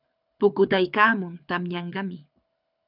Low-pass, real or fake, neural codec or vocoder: 5.4 kHz; fake; codec, 24 kHz, 6 kbps, HILCodec